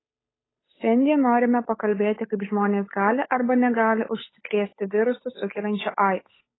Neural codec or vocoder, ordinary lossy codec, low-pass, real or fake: codec, 16 kHz, 8 kbps, FunCodec, trained on Chinese and English, 25 frames a second; AAC, 16 kbps; 7.2 kHz; fake